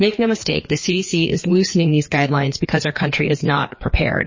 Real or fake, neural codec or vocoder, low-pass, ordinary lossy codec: fake; codec, 16 kHz, 2 kbps, FreqCodec, larger model; 7.2 kHz; MP3, 32 kbps